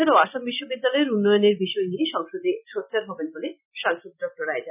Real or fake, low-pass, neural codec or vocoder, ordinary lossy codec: real; 3.6 kHz; none; none